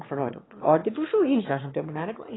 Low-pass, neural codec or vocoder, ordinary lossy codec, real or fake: 7.2 kHz; autoencoder, 22.05 kHz, a latent of 192 numbers a frame, VITS, trained on one speaker; AAC, 16 kbps; fake